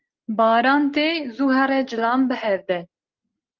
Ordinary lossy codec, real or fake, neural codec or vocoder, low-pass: Opus, 32 kbps; real; none; 7.2 kHz